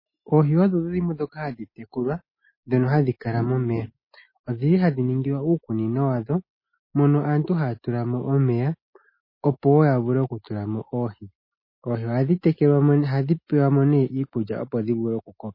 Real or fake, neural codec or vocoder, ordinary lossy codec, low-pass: real; none; MP3, 24 kbps; 5.4 kHz